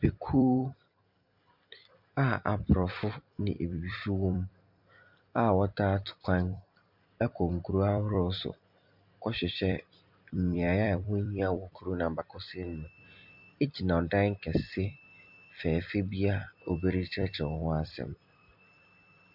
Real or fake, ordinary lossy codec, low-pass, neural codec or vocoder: fake; MP3, 48 kbps; 5.4 kHz; vocoder, 44.1 kHz, 128 mel bands every 256 samples, BigVGAN v2